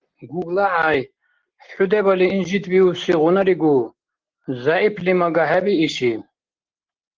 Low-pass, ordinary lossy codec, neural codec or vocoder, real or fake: 7.2 kHz; Opus, 16 kbps; none; real